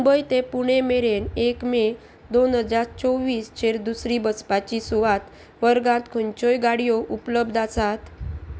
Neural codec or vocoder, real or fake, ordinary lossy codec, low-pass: none; real; none; none